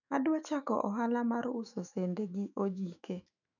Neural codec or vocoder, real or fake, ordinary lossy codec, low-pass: autoencoder, 48 kHz, 128 numbers a frame, DAC-VAE, trained on Japanese speech; fake; none; 7.2 kHz